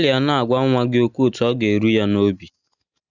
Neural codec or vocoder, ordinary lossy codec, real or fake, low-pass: none; none; real; 7.2 kHz